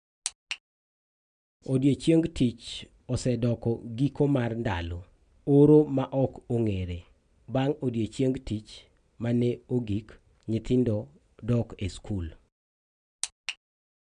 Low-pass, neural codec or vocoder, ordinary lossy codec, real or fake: 9.9 kHz; none; none; real